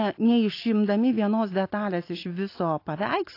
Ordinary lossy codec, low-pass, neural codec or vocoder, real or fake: AAC, 32 kbps; 5.4 kHz; none; real